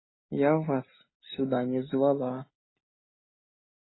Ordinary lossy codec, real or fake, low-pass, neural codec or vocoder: AAC, 16 kbps; real; 7.2 kHz; none